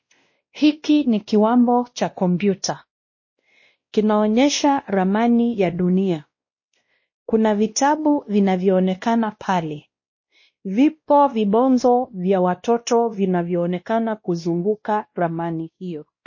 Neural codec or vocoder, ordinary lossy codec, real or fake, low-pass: codec, 16 kHz, 1 kbps, X-Codec, WavLM features, trained on Multilingual LibriSpeech; MP3, 32 kbps; fake; 7.2 kHz